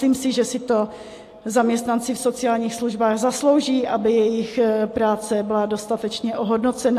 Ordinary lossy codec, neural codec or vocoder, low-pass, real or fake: AAC, 64 kbps; none; 14.4 kHz; real